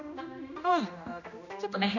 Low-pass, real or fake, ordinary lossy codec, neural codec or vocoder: 7.2 kHz; fake; none; codec, 16 kHz, 1 kbps, X-Codec, HuBERT features, trained on general audio